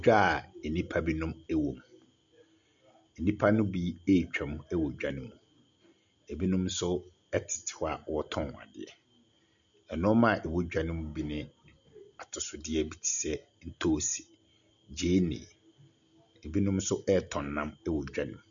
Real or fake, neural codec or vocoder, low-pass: real; none; 7.2 kHz